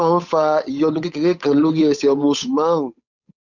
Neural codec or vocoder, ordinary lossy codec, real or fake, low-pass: codec, 16 kHz, 8 kbps, FunCodec, trained on Chinese and English, 25 frames a second; none; fake; 7.2 kHz